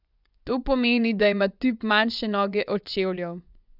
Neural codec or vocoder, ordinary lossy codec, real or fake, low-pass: vocoder, 24 kHz, 100 mel bands, Vocos; none; fake; 5.4 kHz